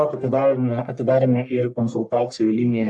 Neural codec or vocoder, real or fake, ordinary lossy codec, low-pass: codec, 44.1 kHz, 1.7 kbps, Pupu-Codec; fake; AAC, 64 kbps; 10.8 kHz